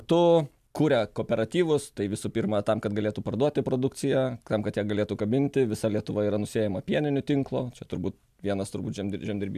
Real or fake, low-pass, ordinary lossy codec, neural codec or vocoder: real; 14.4 kHz; AAC, 96 kbps; none